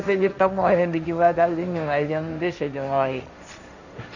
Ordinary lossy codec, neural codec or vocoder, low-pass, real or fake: none; codec, 16 kHz, 1.1 kbps, Voila-Tokenizer; 7.2 kHz; fake